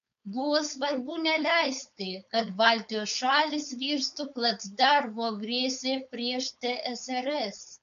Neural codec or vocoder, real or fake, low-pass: codec, 16 kHz, 4.8 kbps, FACodec; fake; 7.2 kHz